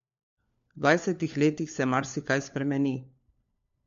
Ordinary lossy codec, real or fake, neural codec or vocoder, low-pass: MP3, 48 kbps; fake; codec, 16 kHz, 4 kbps, FunCodec, trained on LibriTTS, 50 frames a second; 7.2 kHz